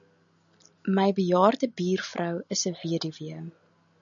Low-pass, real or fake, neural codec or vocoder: 7.2 kHz; real; none